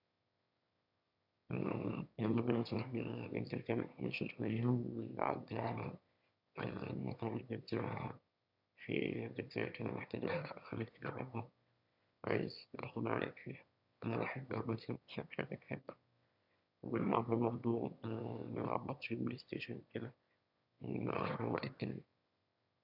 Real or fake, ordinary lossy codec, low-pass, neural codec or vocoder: fake; none; 5.4 kHz; autoencoder, 22.05 kHz, a latent of 192 numbers a frame, VITS, trained on one speaker